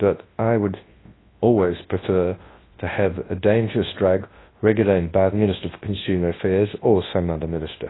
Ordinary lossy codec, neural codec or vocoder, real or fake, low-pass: AAC, 16 kbps; codec, 24 kHz, 0.9 kbps, WavTokenizer, large speech release; fake; 7.2 kHz